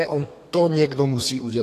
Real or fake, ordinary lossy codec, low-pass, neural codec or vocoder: fake; AAC, 48 kbps; 14.4 kHz; codec, 32 kHz, 1.9 kbps, SNAC